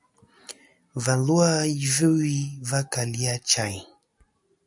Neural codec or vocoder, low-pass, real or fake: none; 10.8 kHz; real